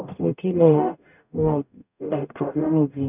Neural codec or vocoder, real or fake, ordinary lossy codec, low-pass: codec, 44.1 kHz, 0.9 kbps, DAC; fake; none; 3.6 kHz